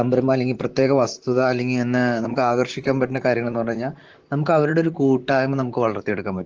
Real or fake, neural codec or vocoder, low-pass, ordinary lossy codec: fake; vocoder, 44.1 kHz, 128 mel bands, Pupu-Vocoder; 7.2 kHz; Opus, 16 kbps